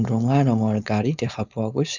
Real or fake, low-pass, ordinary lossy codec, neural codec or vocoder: fake; 7.2 kHz; none; codec, 16 kHz, 4.8 kbps, FACodec